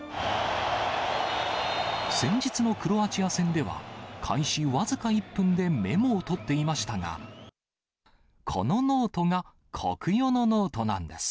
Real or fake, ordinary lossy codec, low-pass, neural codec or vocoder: real; none; none; none